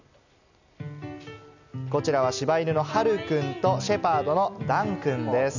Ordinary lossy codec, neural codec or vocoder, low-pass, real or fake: none; none; 7.2 kHz; real